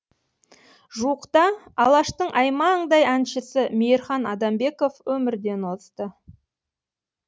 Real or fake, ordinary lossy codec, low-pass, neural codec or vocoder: real; none; none; none